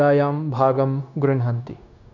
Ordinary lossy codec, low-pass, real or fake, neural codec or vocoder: none; 7.2 kHz; fake; codec, 16 kHz, 0.9 kbps, LongCat-Audio-Codec